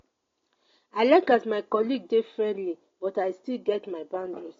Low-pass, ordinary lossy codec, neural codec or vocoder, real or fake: 7.2 kHz; AAC, 32 kbps; none; real